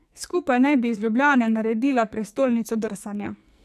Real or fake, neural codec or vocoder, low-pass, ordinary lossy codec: fake; codec, 44.1 kHz, 2.6 kbps, SNAC; 14.4 kHz; none